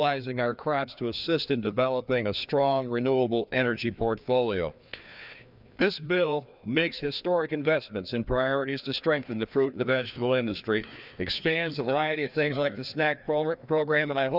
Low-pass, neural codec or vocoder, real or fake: 5.4 kHz; codec, 16 kHz, 2 kbps, FreqCodec, larger model; fake